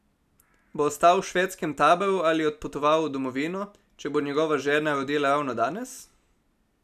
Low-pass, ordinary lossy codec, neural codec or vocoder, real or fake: 14.4 kHz; none; none; real